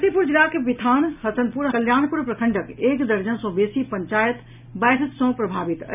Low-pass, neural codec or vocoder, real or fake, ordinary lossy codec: 3.6 kHz; none; real; none